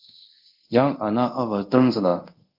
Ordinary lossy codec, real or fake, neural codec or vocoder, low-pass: Opus, 24 kbps; fake; codec, 24 kHz, 0.5 kbps, DualCodec; 5.4 kHz